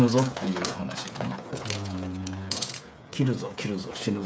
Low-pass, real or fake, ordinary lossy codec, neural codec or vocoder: none; fake; none; codec, 16 kHz, 8 kbps, FreqCodec, smaller model